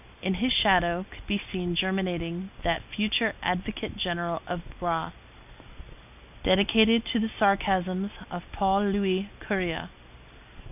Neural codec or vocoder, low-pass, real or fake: none; 3.6 kHz; real